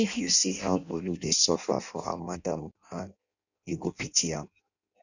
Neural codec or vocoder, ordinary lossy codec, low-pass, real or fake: codec, 16 kHz in and 24 kHz out, 0.6 kbps, FireRedTTS-2 codec; none; 7.2 kHz; fake